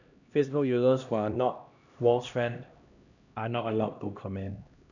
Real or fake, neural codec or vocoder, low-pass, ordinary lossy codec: fake; codec, 16 kHz, 1 kbps, X-Codec, HuBERT features, trained on LibriSpeech; 7.2 kHz; none